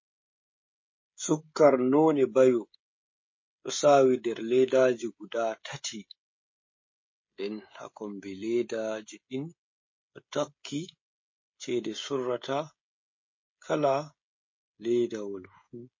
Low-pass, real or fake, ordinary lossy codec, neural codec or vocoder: 7.2 kHz; fake; MP3, 32 kbps; codec, 16 kHz, 16 kbps, FreqCodec, smaller model